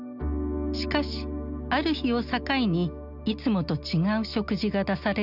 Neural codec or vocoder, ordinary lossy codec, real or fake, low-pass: none; none; real; 5.4 kHz